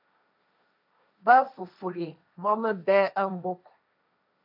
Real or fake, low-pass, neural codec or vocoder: fake; 5.4 kHz; codec, 16 kHz, 1.1 kbps, Voila-Tokenizer